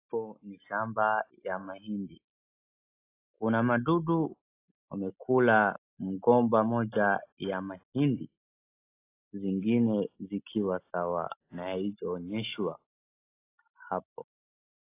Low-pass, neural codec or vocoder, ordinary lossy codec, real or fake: 3.6 kHz; none; AAC, 24 kbps; real